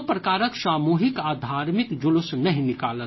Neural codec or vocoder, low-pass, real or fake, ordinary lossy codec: none; 7.2 kHz; real; MP3, 24 kbps